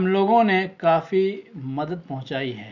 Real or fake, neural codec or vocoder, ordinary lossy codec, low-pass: real; none; none; 7.2 kHz